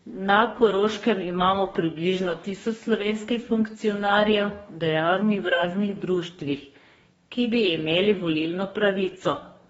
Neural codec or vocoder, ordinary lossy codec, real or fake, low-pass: codec, 44.1 kHz, 2.6 kbps, DAC; AAC, 24 kbps; fake; 19.8 kHz